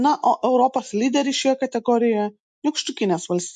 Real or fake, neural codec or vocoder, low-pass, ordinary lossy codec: real; none; 10.8 kHz; MP3, 64 kbps